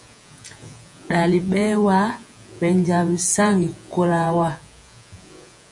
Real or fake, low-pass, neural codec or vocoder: fake; 10.8 kHz; vocoder, 48 kHz, 128 mel bands, Vocos